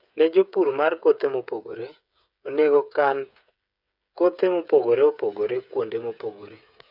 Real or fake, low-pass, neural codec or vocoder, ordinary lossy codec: fake; 5.4 kHz; codec, 16 kHz, 8 kbps, FreqCodec, smaller model; none